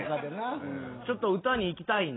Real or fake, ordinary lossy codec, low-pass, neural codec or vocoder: real; AAC, 16 kbps; 7.2 kHz; none